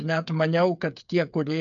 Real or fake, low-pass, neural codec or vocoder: fake; 7.2 kHz; codec, 16 kHz, 8 kbps, FreqCodec, smaller model